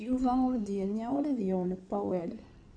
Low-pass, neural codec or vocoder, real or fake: 9.9 kHz; codec, 16 kHz in and 24 kHz out, 2.2 kbps, FireRedTTS-2 codec; fake